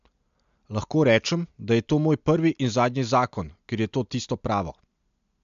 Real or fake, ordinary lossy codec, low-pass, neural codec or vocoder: real; MP3, 64 kbps; 7.2 kHz; none